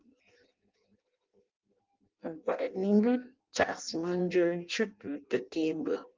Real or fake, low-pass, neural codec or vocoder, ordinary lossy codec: fake; 7.2 kHz; codec, 16 kHz in and 24 kHz out, 0.6 kbps, FireRedTTS-2 codec; Opus, 32 kbps